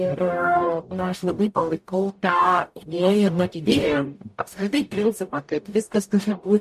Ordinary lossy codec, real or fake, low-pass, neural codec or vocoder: MP3, 64 kbps; fake; 14.4 kHz; codec, 44.1 kHz, 0.9 kbps, DAC